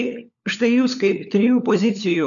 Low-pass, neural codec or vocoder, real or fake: 7.2 kHz; codec, 16 kHz, 4 kbps, FunCodec, trained on LibriTTS, 50 frames a second; fake